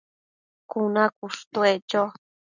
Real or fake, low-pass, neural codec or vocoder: real; 7.2 kHz; none